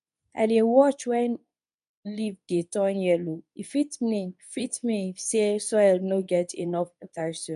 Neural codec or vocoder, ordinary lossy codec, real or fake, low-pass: codec, 24 kHz, 0.9 kbps, WavTokenizer, medium speech release version 2; none; fake; 10.8 kHz